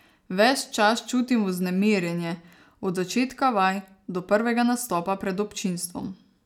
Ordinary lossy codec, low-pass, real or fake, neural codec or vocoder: none; 19.8 kHz; real; none